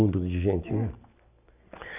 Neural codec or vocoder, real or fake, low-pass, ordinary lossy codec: none; real; 3.6 kHz; none